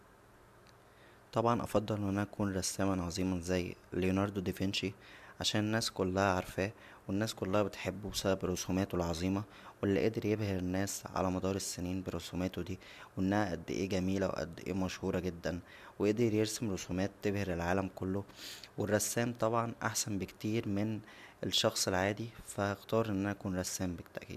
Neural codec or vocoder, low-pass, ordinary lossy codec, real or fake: none; 14.4 kHz; none; real